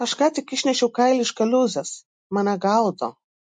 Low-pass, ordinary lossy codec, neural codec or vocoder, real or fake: 10.8 kHz; MP3, 48 kbps; none; real